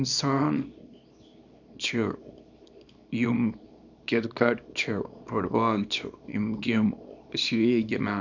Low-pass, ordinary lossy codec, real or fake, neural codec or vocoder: 7.2 kHz; none; fake; codec, 24 kHz, 0.9 kbps, WavTokenizer, small release